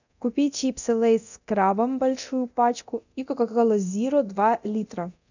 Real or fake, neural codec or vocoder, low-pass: fake; codec, 24 kHz, 0.9 kbps, DualCodec; 7.2 kHz